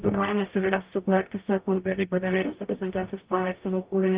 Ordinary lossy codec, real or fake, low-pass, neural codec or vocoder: Opus, 32 kbps; fake; 3.6 kHz; codec, 44.1 kHz, 0.9 kbps, DAC